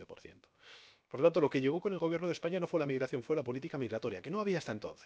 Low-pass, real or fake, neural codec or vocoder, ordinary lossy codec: none; fake; codec, 16 kHz, about 1 kbps, DyCAST, with the encoder's durations; none